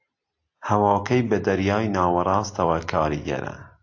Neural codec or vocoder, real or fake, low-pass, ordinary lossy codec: none; real; 7.2 kHz; AAC, 48 kbps